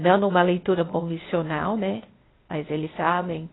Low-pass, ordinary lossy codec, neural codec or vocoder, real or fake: 7.2 kHz; AAC, 16 kbps; codec, 16 kHz, 0.8 kbps, ZipCodec; fake